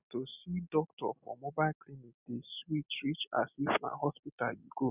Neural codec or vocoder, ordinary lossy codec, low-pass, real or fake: none; none; 3.6 kHz; real